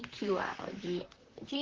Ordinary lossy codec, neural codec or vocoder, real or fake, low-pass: Opus, 16 kbps; vocoder, 44.1 kHz, 128 mel bands, Pupu-Vocoder; fake; 7.2 kHz